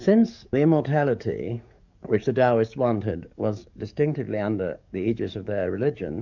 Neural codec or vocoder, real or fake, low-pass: codec, 44.1 kHz, 7.8 kbps, DAC; fake; 7.2 kHz